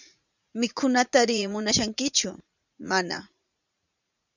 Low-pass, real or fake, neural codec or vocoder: 7.2 kHz; fake; vocoder, 44.1 kHz, 80 mel bands, Vocos